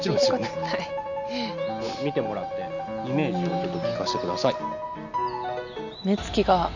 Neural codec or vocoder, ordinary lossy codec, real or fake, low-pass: none; none; real; 7.2 kHz